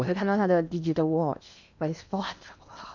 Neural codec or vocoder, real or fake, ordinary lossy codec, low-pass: codec, 16 kHz in and 24 kHz out, 0.8 kbps, FocalCodec, streaming, 65536 codes; fake; none; 7.2 kHz